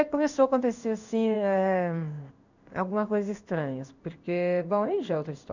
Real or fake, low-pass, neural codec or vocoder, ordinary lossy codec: fake; 7.2 kHz; codec, 16 kHz in and 24 kHz out, 1 kbps, XY-Tokenizer; none